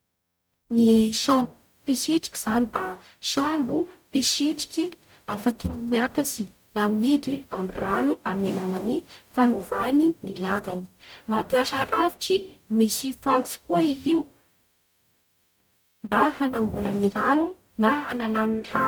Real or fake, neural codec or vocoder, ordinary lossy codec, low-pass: fake; codec, 44.1 kHz, 0.9 kbps, DAC; none; none